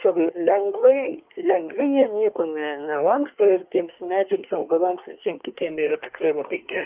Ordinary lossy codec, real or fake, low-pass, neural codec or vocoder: Opus, 24 kbps; fake; 3.6 kHz; codec, 24 kHz, 1 kbps, SNAC